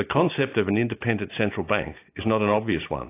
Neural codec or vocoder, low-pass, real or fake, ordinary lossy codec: none; 3.6 kHz; real; AAC, 24 kbps